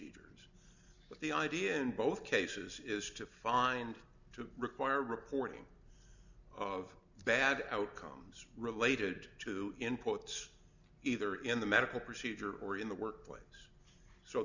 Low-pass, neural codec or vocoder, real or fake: 7.2 kHz; none; real